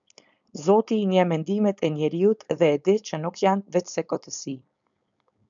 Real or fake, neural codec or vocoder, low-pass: fake; codec, 16 kHz, 4.8 kbps, FACodec; 7.2 kHz